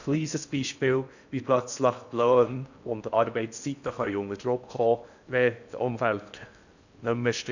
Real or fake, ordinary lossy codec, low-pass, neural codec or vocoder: fake; none; 7.2 kHz; codec, 16 kHz in and 24 kHz out, 0.6 kbps, FocalCodec, streaming, 4096 codes